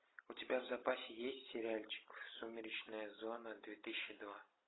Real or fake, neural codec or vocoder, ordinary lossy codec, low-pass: real; none; AAC, 16 kbps; 7.2 kHz